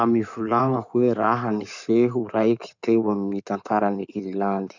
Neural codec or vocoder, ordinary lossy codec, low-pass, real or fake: codec, 16 kHz in and 24 kHz out, 2.2 kbps, FireRedTTS-2 codec; none; 7.2 kHz; fake